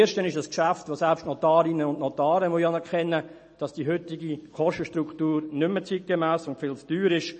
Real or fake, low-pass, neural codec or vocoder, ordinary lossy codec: real; 10.8 kHz; none; MP3, 32 kbps